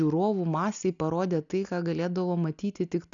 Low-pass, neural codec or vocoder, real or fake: 7.2 kHz; none; real